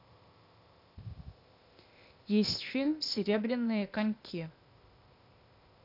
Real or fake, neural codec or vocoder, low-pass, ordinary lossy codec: fake; codec, 16 kHz, 0.8 kbps, ZipCodec; 5.4 kHz; none